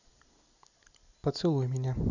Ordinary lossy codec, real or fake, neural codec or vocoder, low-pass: none; real; none; 7.2 kHz